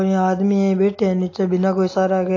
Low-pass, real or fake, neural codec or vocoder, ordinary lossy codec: 7.2 kHz; real; none; AAC, 32 kbps